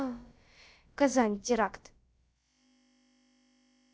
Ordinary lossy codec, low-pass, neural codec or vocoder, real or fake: none; none; codec, 16 kHz, about 1 kbps, DyCAST, with the encoder's durations; fake